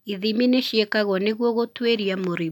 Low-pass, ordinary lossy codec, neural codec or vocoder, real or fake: 19.8 kHz; none; none; real